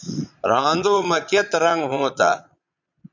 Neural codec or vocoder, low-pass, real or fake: vocoder, 22.05 kHz, 80 mel bands, Vocos; 7.2 kHz; fake